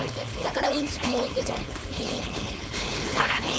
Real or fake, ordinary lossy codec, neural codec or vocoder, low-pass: fake; none; codec, 16 kHz, 4.8 kbps, FACodec; none